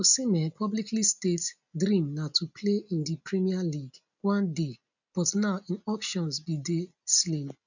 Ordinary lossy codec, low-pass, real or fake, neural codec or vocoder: none; 7.2 kHz; real; none